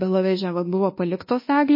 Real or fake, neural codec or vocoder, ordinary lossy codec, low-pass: fake; codec, 24 kHz, 1.2 kbps, DualCodec; MP3, 24 kbps; 5.4 kHz